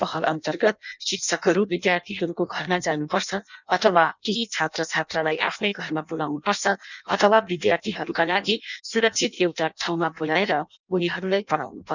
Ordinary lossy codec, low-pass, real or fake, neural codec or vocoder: none; 7.2 kHz; fake; codec, 16 kHz in and 24 kHz out, 0.6 kbps, FireRedTTS-2 codec